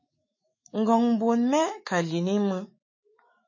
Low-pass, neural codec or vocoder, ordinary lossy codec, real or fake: 7.2 kHz; autoencoder, 48 kHz, 128 numbers a frame, DAC-VAE, trained on Japanese speech; MP3, 32 kbps; fake